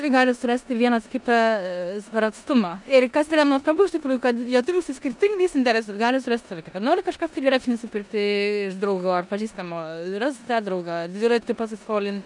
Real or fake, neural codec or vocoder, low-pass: fake; codec, 16 kHz in and 24 kHz out, 0.9 kbps, LongCat-Audio-Codec, four codebook decoder; 10.8 kHz